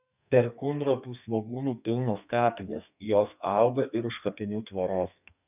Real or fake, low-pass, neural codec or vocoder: fake; 3.6 kHz; codec, 32 kHz, 1.9 kbps, SNAC